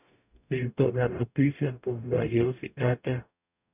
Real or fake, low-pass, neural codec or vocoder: fake; 3.6 kHz; codec, 44.1 kHz, 0.9 kbps, DAC